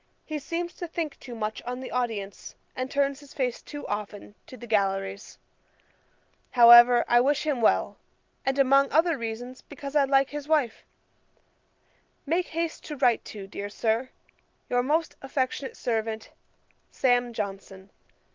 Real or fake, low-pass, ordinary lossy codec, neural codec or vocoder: real; 7.2 kHz; Opus, 32 kbps; none